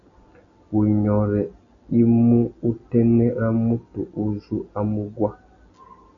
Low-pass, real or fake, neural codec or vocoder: 7.2 kHz; real; none